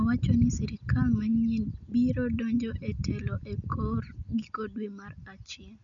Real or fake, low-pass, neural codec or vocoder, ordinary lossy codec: real; 7.2 kHz; none; none